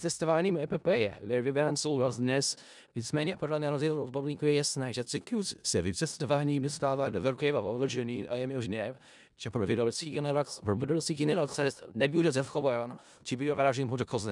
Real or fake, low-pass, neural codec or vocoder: fake; 10.8 kHz; codec, 16 kHz in and 24 kHz out, 0.4 kbps, LongCat-Audio-Codec, four codebook decoder